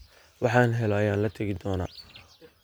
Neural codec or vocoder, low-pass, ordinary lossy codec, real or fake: none; none; none; real